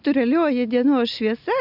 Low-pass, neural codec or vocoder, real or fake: 5.4 kHz; none; real